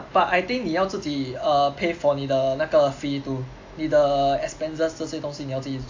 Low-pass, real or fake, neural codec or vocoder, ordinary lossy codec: 7.2 kHz; real; none; AAC, 48 kbps